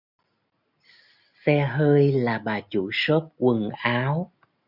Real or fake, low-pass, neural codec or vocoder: real; 5.4 kHz; none